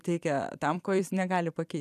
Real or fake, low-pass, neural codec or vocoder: fake; 14.4 kHz; vocoder, 44.1 kHz, 128 mel bands every 256 samples, BigVGAN v2